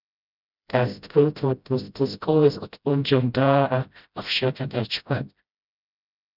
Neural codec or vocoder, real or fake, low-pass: codec, 16 kHz, 0.5 kbps, FreqCodec, smaller model; fake; 5.4 kHz